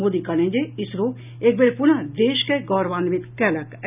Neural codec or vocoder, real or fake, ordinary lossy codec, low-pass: none; real; none; 3.6 kHz